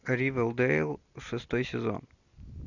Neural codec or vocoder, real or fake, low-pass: none; real; 7.2 kHz